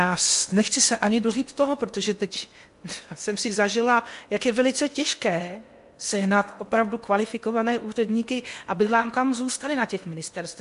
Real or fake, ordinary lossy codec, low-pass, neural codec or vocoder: fake; MP3, 64 kbps; 10.8 kHz; codec, 16 kHz in and 24 kHz out, 0.8 kbps, FocalCodec, streaming, 65536 codes